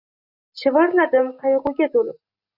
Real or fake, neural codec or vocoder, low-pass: real; none; 5.4 kHz